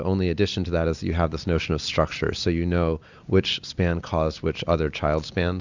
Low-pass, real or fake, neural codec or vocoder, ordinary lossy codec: 7.2 kHz; real; none; Opus, 64 kbps